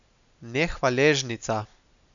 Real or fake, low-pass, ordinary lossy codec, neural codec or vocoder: real; 7.2 kHz; none; none